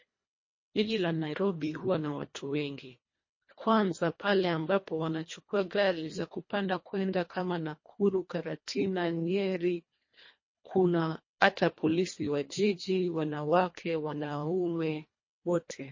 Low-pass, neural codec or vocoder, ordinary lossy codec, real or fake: 7.2 kHz; codec, 24 kHz, 1.5 kbps, HILCodec; MP3, 32 kbps; fake